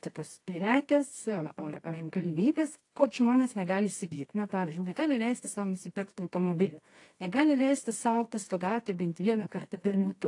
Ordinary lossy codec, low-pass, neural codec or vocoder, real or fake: AAC, 48 kbps; 10.8 kHz; codec, 24 kHz, 0.9 kbps, WavTokenizer, medium music audio release; fake